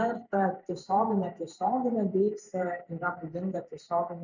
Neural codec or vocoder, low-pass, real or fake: vocoder, 44.1 kHz, 128 mel bands every 512 samples, BigVGAN v2; 7.2 kHz; fake